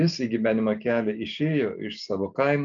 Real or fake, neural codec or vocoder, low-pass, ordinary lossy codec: real; none; 7.2 kHz; AAC, 64 kbps